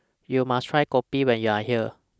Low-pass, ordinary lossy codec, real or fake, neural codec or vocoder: none; none; real; none